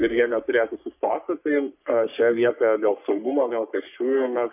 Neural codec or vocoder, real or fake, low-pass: codec, 44.1 kHz, 3.4 kbps, Pupu-Codec; fake; 3.6 kHz